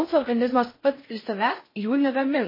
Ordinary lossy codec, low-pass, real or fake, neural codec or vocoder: MP3, 24 kbps; 5.4 kHz; fake; codec, 16 kHz in and 24 kHz out, 0.6 kbps, FocalCodec, streaming, 4096 codes